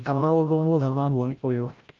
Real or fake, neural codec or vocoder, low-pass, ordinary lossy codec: fake; codec, 16 kHz, 0.5 kbps, FreqCodec, larger model; 7.2 kHz; Opus, 24 kbps